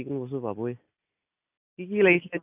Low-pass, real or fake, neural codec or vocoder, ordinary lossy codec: 3.6 kHz; real; none; AAC, 32 kbps